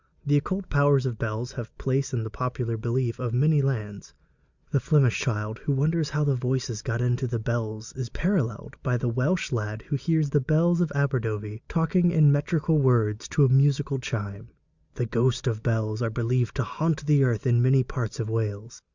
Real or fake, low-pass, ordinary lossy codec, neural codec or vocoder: real; 7.2 kHz; Opus, 64 kbps; none